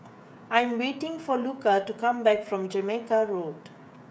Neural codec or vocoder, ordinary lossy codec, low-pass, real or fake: codec, 16 kHz, 16 kbps, FreqCodec, smaller model; none; none; fake